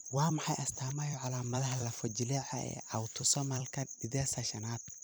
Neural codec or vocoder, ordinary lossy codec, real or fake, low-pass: vocoder, 44.1 kHz, 128 mel bands every 256 samples, BigVGAN v2; none; fake; none